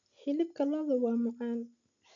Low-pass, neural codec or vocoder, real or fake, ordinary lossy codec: 7.2 kHz; none; real; none